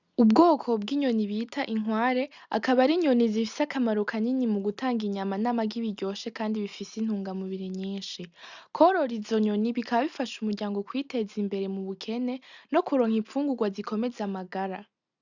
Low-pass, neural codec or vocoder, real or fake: 7.2 kHz; none; real